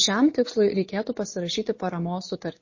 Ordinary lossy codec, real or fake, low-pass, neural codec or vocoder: MP3, 32 kbps; real; 7.2 kHz; none